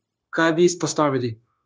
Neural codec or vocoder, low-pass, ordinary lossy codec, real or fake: codec, 16 kHz, 0.9 kbps, LongCat-Audio-Codec; none; none; fake